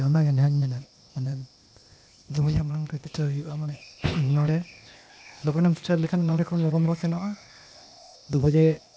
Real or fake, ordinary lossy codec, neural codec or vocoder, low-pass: fake; none; codec, 16 kHz, 0.8 kbps, ZipCodec; none